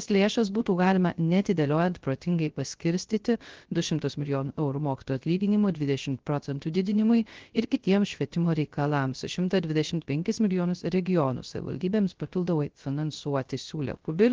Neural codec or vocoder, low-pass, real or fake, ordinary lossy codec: codec, 16 kHz, 0.3 kbps, FocalCodec; 7.2 kHz; fake; Opus, 16 kbps